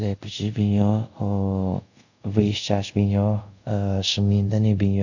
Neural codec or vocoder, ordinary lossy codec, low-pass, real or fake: codec, 24 kHz, 0.5 kbps, DualCodec; none; 7.2 kHz; fake